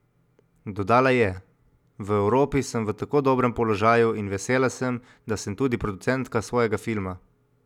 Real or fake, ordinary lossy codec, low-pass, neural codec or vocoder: real; none; 19.8 kHz; none